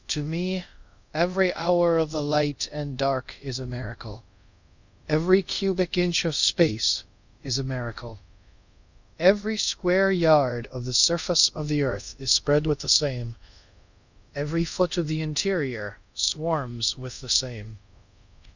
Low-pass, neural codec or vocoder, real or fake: 7.2 kHz; codec, 24 kHz, 0.5 kbps, DualCodec; fake